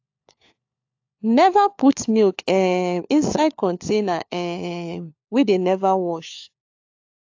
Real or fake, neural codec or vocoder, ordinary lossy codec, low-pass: fake; codec, 16 kHz, 4 kbps, FunCodec, trained on LibriTTS, 50 frames a second; AAC, 48 kbps; 7.2 kHz